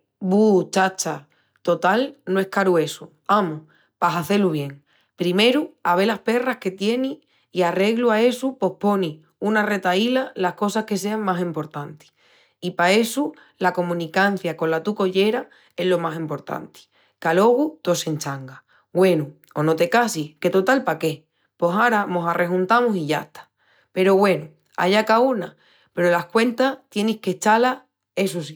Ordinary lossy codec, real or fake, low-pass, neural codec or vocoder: none; real; none; none